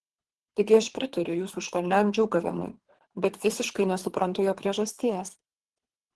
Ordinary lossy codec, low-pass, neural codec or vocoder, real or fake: Opus, 16 kbps; 10.8 kHz; codec, 24 kHz, 3 kbps, HILCodec; fake